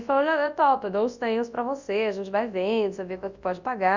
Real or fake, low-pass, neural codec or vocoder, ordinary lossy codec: fake; 7.2 kHz; codec, 24 kHz, 0.9 kbps, WavTokenizer, large speech release; Opus, 64 kbps